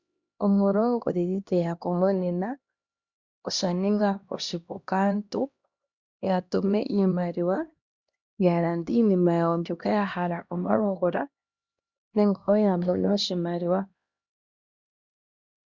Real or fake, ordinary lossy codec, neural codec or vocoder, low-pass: fake; Opus, 64 kbps; codec, 16 kHz, 1 kbps, X-Codec, HuBERT features, trained on LibriSpeech; 7.2 kHz